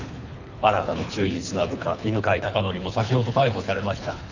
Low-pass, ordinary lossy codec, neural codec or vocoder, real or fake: 7.2 kHz; none; codec, 24 kHz, 3 kbps, HILCodec; fake